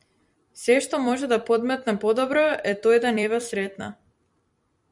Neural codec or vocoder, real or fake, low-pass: vocoder, 44.1 kHz, 128 mel bands every 256 samples, BigVGAN v2; fake; 10.8 kHz